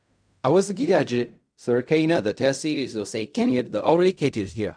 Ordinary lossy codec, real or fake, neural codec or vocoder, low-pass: none; fake; codec, 16 kHz in and 24 kHz out, 0.4 kbps, LongCat-Audio-Codec, fine tuned four codebook decoder; 9.9 kHz